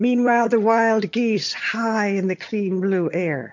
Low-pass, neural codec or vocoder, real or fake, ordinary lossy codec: 7.2 kHz; vocoder, 22.05 kHz, 80 mel bands, HiFi-GAN; fake; MP3, 48 kbps